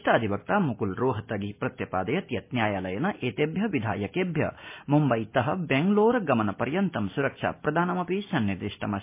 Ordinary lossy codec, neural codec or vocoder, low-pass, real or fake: MP3, 24 kbps; none; 3.6 kHz; real